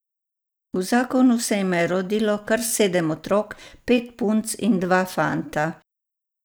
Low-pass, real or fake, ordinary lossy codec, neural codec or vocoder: none; real; none; none